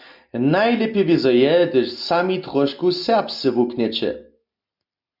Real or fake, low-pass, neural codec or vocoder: real; 5.4 kHz; none